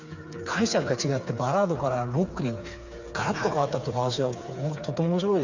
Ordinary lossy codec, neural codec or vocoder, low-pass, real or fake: Opus, 64 kbps; codec, 16 kHz, 4 kbps, FreqCodec, smaller model; 7.2 kHz; fake